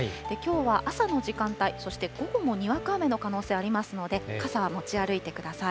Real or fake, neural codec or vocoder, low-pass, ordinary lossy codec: real; none; none; none